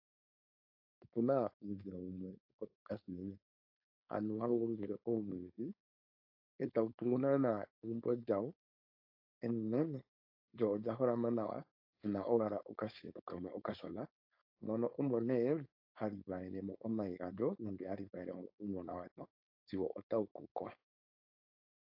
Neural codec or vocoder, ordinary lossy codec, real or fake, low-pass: codec, 16 kHz, 4.8 kbps, FACodec; AAC, 48 kbps; fake; 5.4 kHz